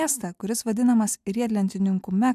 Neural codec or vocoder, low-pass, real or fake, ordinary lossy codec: none; 14.4 kHz; real; MP3, 96 kbps